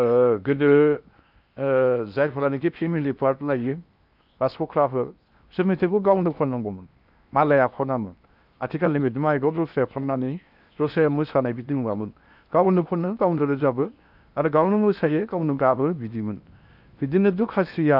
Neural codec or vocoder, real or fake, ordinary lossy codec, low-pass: codec, 16 kHz in and 24 kHz out, 0.8 kbps, FocalCodec, streaming, 65536 codes; fake; none; 5.4 kHz